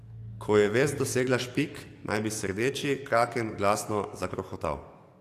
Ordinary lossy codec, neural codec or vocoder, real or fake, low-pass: AAC, 64 kbps; codec, 44.1 kHz, 7.8 kbps, DAC; fake; 14.4 kHz